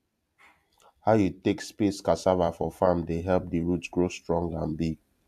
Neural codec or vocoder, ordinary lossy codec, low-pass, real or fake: vocoder, 44.1 kHz, 128 mel bands every 256 samples, BigVGAN v2; AAC, 96 kbps; 14.4 kHz; fake